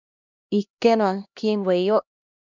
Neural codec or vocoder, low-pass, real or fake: codec, 16 kHz, 1 kbps, X-Codec, HuBERT features, trained on LibriSpeech; 7.2 kHz; fake